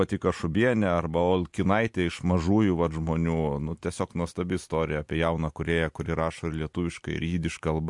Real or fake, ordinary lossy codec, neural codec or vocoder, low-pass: real; MP3, 64 kbps; none; 10.8 kHz